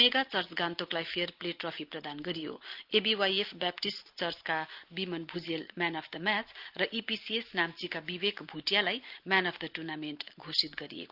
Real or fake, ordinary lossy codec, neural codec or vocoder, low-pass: real; Opus, 32 kbps; none; 5.4 kHz